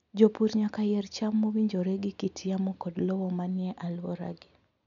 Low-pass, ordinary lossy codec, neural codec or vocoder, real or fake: 7.2 kHz; none; none; real